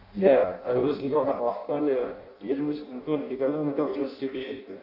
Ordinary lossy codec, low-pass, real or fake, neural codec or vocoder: AAC, 48 kbps; 5.4 kHz; fake; codec, 16 kHz in and 24 kHz out, 0.6 kbps, FireRedTTS-2 codec